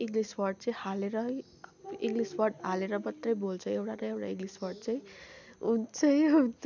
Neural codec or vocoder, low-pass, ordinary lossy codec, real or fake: none; 7.2 kHz; none; real